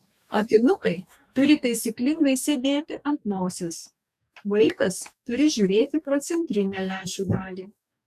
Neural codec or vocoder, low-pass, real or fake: codec, 44.1 kHz, 2.6 kbps, DAC; 14.4 kHz; fake